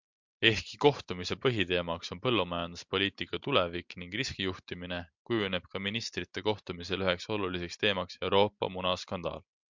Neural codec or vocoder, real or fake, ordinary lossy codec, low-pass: none; real; MP3, 64 kbps; 7.2 kHz